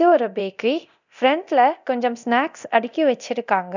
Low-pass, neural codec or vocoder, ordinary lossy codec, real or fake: 7.2 kHz; codec, 24 kHz, 0.9 kbps, DualCodec; none; fake